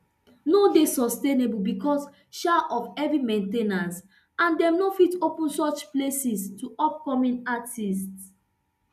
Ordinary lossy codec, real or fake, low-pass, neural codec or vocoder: none; real; 14.4 kHz; none